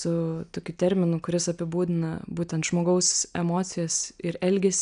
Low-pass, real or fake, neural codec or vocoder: 9.9 kHz; real; none